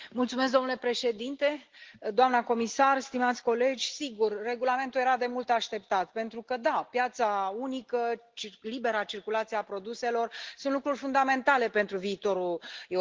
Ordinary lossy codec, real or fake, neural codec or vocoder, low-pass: Opus, 16 kbps; real; none; 7.2 kHz